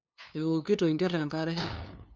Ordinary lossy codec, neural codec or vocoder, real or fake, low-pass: none; codec, 16 kHz, 2 kbps, FunCodec, trained on LibriTTS, 25 frames a second; fake; none